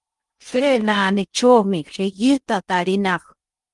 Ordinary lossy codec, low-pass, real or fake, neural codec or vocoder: Opus, 32 kbps; 10.8 kHz; fake; codec, 16 kHz in and 24 kHz out, 0.6 kbps, FocalCodec, streaming, 2048 codes